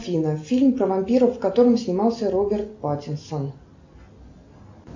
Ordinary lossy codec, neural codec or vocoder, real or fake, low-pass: AAC, 48 kbps; none; real; 7.2 kHz